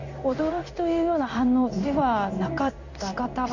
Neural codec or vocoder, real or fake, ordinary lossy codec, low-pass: codec, 16 kHz in and 24 kHz out, 1 kbps, XY-Tokenizer; fake; none; 7.2 kHz